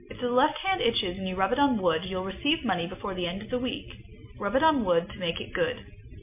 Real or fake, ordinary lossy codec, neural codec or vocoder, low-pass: real; MP3, 32 kbps; none; 3.6 kHz